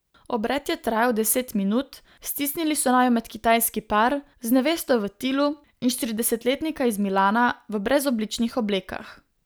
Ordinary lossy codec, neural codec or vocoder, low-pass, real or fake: none; none; none; real